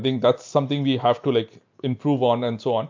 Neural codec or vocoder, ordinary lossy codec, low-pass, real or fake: none; MP3, 48 kbps; 7.2 kHz; real